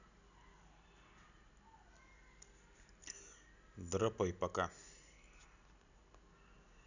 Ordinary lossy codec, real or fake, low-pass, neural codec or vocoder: none; real; 7.2 kHz; none